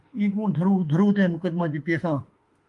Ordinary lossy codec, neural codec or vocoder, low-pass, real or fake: Opus, 32 kbps; autoencoder, 48 kHz, 32 numbers a frame, DAC-VAE, trained on Japanese speech; 10.8 kHz; fake